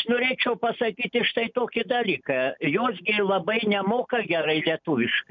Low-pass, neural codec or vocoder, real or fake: 7.2 kHz; none; real